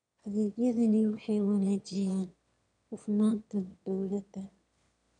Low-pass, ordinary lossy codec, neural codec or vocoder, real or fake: 9.9 kHz; none; autoencoder, 22.05 kHz, a latent of 192 numbers a frame, VITS, trained on one speaker; fake